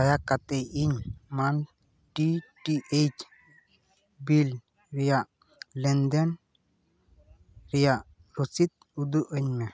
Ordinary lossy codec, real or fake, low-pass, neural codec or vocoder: none; real; none; none